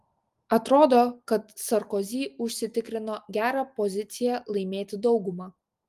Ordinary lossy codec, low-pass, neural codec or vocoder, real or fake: Opus, 32 kbps; 14.4 kHz; none; real